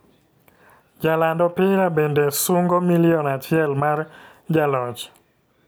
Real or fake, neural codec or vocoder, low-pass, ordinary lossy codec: real; none; none; none